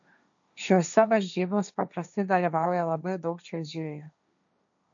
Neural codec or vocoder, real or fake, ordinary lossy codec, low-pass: codec, 16 kHz, 1.1 kbps, Voila-Tokenizer; fake; MP3, 96 kbps; 7.2 kHz